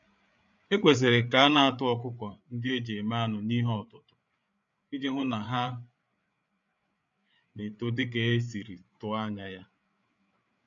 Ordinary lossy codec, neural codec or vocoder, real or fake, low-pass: none; codec, 16 kHz, 8 kbps, FreqCodec, larger model; fake; 7.2 kHz